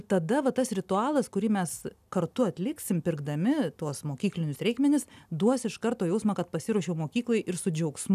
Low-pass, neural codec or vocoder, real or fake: 14.4 kHz; none; real